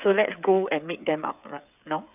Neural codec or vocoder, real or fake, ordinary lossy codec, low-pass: codec, 16 kHz, 8 kbps, FreqCodec, smaller model; fake; none; 3.6 kHz